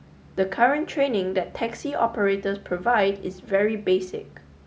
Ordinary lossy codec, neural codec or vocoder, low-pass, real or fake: none; none; none; real